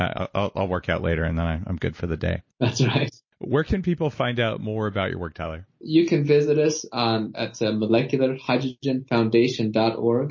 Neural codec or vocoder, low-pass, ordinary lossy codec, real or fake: none; 7.2 kHz; MP3, 32 kbps; real